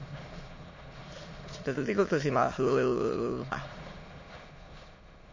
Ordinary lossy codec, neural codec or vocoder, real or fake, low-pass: MP3, 32 kbps; autoencoder, 22.05 kHz, a latent of 192 numbers a frame, VITS, trained on many speakers; fake; 7.2 kHz